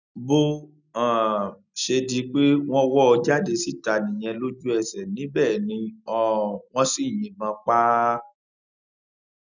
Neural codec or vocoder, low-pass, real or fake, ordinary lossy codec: none; 7.2 kHz; real; none